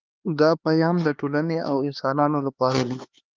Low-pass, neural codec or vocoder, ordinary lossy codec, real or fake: 7.2 kHz; codec, 16 kHz, 2 kbps, X-Codec, HuBERT features, trained on LibriSpeech; Opus, 24 kbps; fake